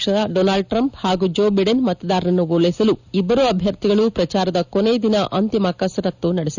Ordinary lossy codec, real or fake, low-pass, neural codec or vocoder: none; real; 7.2 kHz; none